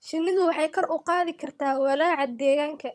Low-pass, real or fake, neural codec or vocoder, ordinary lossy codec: none; fake; vocoder, 22.05 kHz, 80 mel bands, HiFi-GAN; none